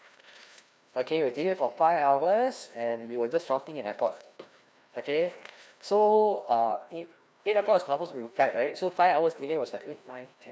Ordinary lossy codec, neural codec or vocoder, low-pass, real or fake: none; codec, 16 kHz, 1 kbps, FreqCodec, larger model; none; fake